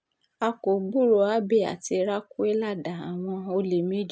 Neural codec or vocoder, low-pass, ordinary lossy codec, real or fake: none; none; none; real